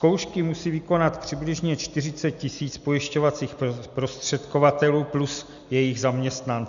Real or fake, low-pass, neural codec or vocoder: real; 7.2 kHz; none